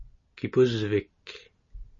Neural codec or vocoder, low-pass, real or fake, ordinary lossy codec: none; 7.2 kHz; real; MP3, 32 kbps